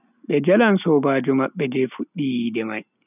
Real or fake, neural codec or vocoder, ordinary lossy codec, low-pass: real; none; none; 3.6 kHz